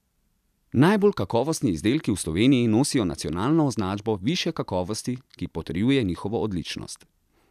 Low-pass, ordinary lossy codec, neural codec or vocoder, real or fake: 14.4 kHz; none; none; real